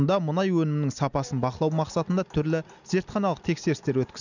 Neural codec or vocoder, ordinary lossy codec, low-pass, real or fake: none; none; 7.2 kHz; real